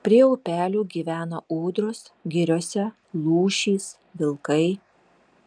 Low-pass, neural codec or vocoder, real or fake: 9.9 kHz; none; real